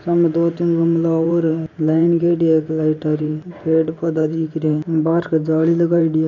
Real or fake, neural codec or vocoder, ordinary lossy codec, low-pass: fake; vocoder, 44.1 kHz, 128 mel bands every 512 samples, BigVGAN v2; none; 7.2 kHz